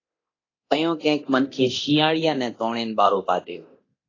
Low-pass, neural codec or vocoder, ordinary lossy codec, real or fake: 7.2 kHz; codec, 24 kHz, 0.9 kbps, DualCodec; AAC, 32 kbps; fake